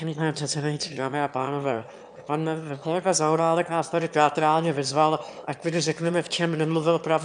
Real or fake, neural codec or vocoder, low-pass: fake; autoencoder, 22.05 kHz, a latent of 192 numbers a frame, VITS, trained on one speaker; 9.9 kHz